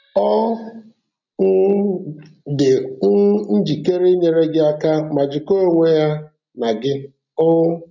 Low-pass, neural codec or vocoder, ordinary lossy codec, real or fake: 7.2 kHz; none; none; real